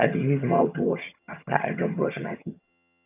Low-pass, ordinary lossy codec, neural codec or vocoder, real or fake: 3.6 kHz; none; vocoder, 22.05 kHz, 80 mel bands, HiFi-GAN; fake